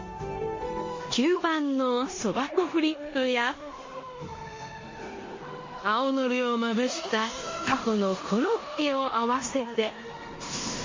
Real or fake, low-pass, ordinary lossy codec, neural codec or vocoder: fake; 7.2 kHz; MP3, 32 kbps; codec, 16 kHz in and 24 kHz out, 0.9 kbps, LongCat-Audio-Codec, four codebook decoder